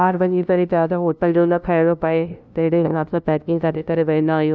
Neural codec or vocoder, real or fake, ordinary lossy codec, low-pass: codec, 16 kHz, 0.5 kbps, FunCodec, trained on LibriTTS, 25 frames a second; fake; none; none